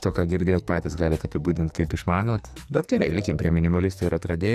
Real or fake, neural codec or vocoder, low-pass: fake; codec, 44.1 kHz, 2.6 kbps, SNAC; 14.4 kHz